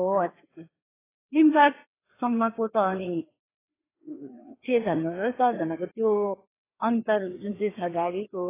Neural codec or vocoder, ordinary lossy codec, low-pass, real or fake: codec, 16 kHz, 2 kbps, FreqCodec, larger model; AAC, 16 kbps; 3.6 kHz; fake